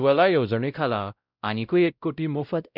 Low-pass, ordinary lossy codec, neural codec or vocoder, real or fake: 5.4 kHz; none; codec, 16 kHz, 0.5 kbps, X-Codec, WavLM features, trained on Multilingual LibriSpeech; fake